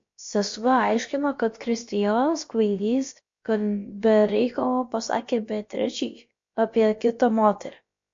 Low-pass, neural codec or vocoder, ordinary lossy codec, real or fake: 7.2 kHz; codec, 16 kHz, about 1 kbps, DyCAST, with the encoder's durations; MP3, 48 kbps; fake